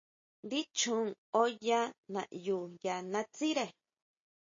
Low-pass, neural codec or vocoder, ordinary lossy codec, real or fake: 7.2 kHz; none; MP3, 32 kbps; real